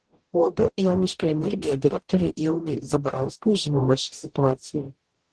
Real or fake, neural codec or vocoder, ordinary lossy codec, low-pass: fake; codec, 44.1 kHz, 0.9 kbps, DAC; Opus, 16 kbps; 10.8 kHz